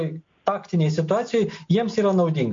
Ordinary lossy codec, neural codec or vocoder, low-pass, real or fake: MP3, 48 kbps; none; 7.2 kHz; real